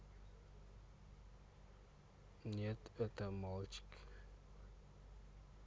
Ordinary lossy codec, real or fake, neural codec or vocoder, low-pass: none; real; none; none